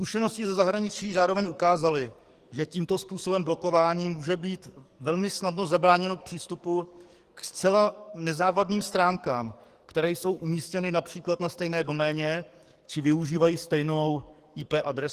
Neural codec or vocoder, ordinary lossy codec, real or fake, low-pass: codec, 44.1 kHz, 2.6 kbps, SNAC; Opus, 24 kbps; fake; 14.4 kHz